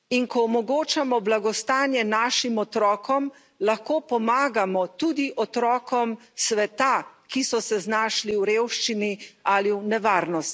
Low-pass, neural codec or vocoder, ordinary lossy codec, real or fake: none; none; none; real